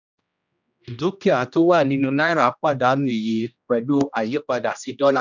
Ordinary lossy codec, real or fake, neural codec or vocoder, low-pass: none; fake; codec, 16 kHz, 1 kbps, X-Codec, HuBERT features, trained on general audio; 7.2 kHz